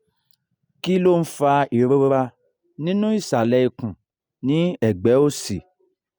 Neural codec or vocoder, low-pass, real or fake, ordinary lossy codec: none; none; real; none